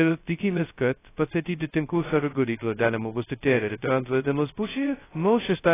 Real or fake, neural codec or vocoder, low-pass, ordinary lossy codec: fake; codec, 16 kHz, 0.2 kbps, FocalCodec; 3.6 kHz; AAC, 16 kbps